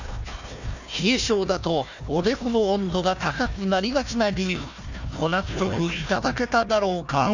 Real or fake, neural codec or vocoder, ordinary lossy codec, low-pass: fake; codec, 16 kHz, 1 kbps, FunCodec, trained on Chinese and English, 50 frames a second; none; 7.2 kHz